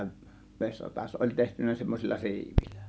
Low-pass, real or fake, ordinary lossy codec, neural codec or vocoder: none; real; none; none